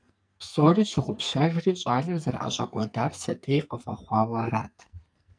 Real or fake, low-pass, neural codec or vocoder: fake; 9.9 kHz; codec, 44.1 kHz, 2.6 kbps, SNAC